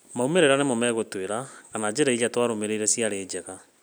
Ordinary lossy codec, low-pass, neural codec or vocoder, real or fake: none; none; none; real